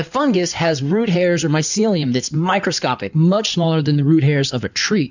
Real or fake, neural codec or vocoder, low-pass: fake; codec, 16 kHz in and 24 kHz out, 2.2 kbps, FireRedTTS-2 codec; 7.2 kHz